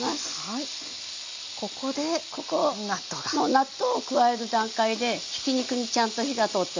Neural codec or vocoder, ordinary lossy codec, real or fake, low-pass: none; MP3, 48 kbps; real; 7.2 kHz